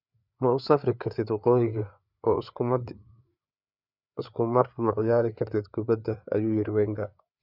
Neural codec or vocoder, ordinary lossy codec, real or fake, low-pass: codec, 16 kHz, 4 kbps, FreqCodec, larger model; none; fake; 5.4 kHz